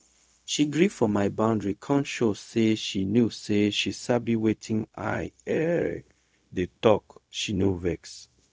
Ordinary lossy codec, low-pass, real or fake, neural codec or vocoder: none; none; fake; codec, 16 kHz, 0.4 kbps, LongCat-Audio-Codec